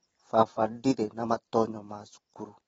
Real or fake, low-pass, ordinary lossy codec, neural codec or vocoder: fake; 19.8 kHz; AAC, 24 kbps; vocoder, 44.1 kHz, 128 mel bands every 512 samples, BigVGAN v2